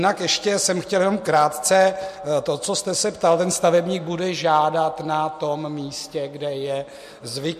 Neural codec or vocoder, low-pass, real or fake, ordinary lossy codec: none; 14.4 kHz; real; MP3, 64 kbps